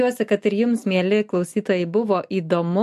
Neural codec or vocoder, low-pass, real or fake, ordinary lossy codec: none; 14.4 kHz; real; MP3, 64 kbps